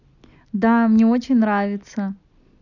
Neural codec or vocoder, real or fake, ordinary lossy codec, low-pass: none; real; none; 7.2 kHz